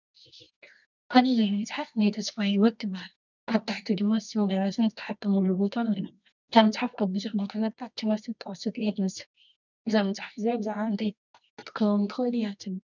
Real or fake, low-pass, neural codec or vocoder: fake; 7.2 kHz; codec, 24 kHz, 0.9 kbps, WavTokenizer, medium music audio release